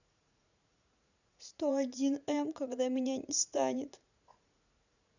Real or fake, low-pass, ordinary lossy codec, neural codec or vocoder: fake; 7.2 kHz; none; vocoder, 44.1 kHz, 80 mel bands, Vocos